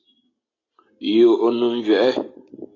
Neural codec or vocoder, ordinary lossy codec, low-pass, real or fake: none; AAC, 32 kbps; 7.2 kHz; real